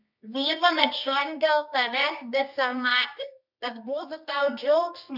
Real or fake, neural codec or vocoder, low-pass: fake; codec, 24 kHz, 0.9 kbps, WavTokenizer, medium music audio release; 5.4 kHz